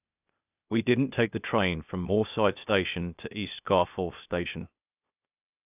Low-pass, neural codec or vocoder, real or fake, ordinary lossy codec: 3.6 kHz; codec, 16 kHz, 0.8 kbps, ZipCodec; fake; none